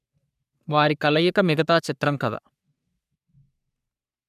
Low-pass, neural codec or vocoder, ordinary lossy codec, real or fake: 14.4 kHz; codec, 44.1 kHz, 3.4 kbps, Pupu-Codec; none; fake